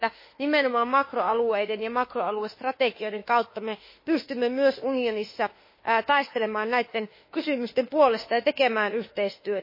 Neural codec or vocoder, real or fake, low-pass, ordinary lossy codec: autoencoder, 48 kHz, 32 numbers a frame, DAC-VAE, trained on Japanese speech; fake; 5.4 kHz; MP3, 24 kbps